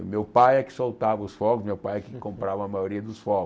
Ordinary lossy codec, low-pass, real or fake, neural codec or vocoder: none; none; real; none